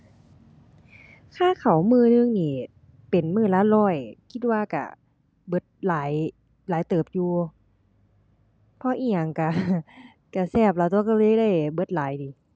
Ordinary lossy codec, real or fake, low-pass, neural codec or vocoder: none; real; none; none